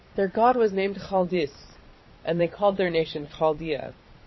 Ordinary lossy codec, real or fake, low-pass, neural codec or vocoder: MP3, 24 kbps; fake; 7.2 kHz; vocoder, 22.05 kHz, 80 mel bands, Vocos